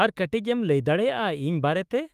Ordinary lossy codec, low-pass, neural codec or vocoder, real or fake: Opus, 32 kbps; 14.4 kHz; autoencoder, 48 kHz, 32 numbers a frame, DAC-VAE, trained on Japanese speech; fake